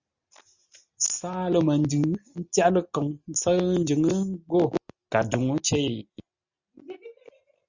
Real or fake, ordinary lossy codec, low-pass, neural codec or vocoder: real; Opus, 64 kbps; 7.2 kHz; none